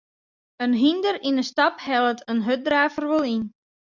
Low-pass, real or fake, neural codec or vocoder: 7.2 kHz; real; none